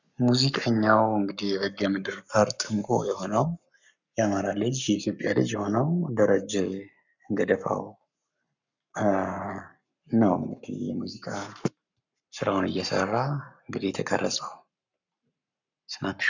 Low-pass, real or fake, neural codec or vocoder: 7.2 kHz; fake; codec, 44.1 kHz, 7.8 kbps, Pupu-Codec